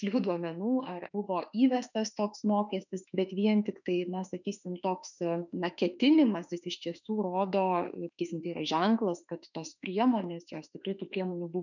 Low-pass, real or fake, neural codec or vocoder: 7.2 kHz; fake; autoencoder, 48 kHz, 32 numbers a frame, DAC-VAE, trained on Japanese speech